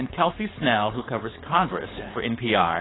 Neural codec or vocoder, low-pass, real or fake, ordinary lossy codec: codec, 16 kHz, 4.8 kbps, FACodec; 7.2 kHz; fake; AAC, 16 kbps